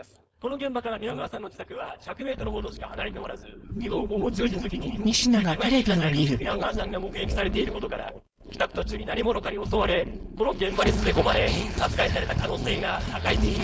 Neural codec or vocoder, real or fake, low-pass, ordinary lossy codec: codec, 16 kHz, 4.8 kbps, FACodec; fake; none; none